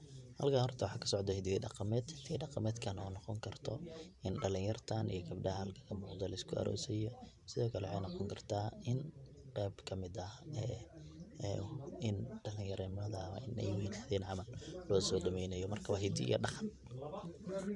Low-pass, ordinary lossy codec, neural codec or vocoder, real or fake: 10.8 kHz; none; vocoder, 44.1 kHz, 128 mel bands every 512 samples, BigVGAN v2; fake